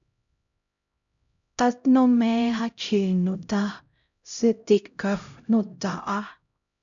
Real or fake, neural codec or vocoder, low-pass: fake; codec, 16 kHz, 0.5 kbps, X-Codec, HuBERT features, trained on LibriSpeech; 7.2 kHz